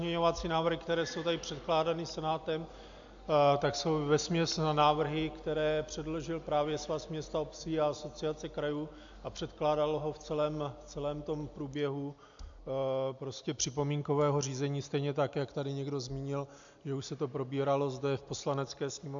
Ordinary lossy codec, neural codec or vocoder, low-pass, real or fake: AAC, 64 kbps; none; 7.2 kHz; real